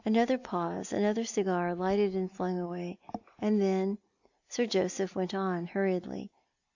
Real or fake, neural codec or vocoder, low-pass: real; none; 7.2 kHz